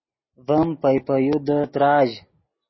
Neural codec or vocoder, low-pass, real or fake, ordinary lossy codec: none; 7.2 kHz; real; MP3, 24 kbps